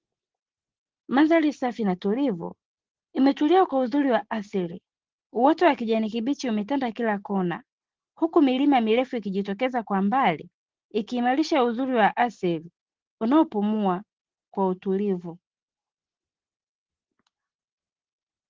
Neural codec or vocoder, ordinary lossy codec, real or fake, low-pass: none; Opus, 16 kbps; real; 7.2 kHz